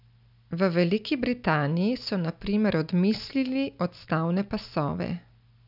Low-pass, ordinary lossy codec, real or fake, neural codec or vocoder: 5.4 kHz; none; real; none